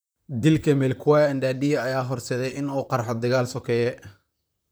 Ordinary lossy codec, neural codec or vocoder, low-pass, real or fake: none; vocoder, 44.1 kHz, 128 mel bands, Pupu-Vocoder; none; fake